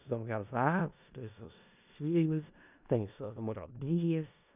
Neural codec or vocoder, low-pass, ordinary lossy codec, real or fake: codec, 16 kHz in and 24 kHz out, 0.4 kbps, LongCat-Audio-Codec, four codebook decoder; 3.6 kHz; none; fake